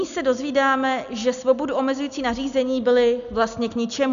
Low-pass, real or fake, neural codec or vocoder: 7.2 kHz; real; none